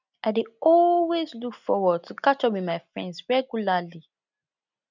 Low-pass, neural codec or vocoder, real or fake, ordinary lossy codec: 7.2 kHz; none; real; none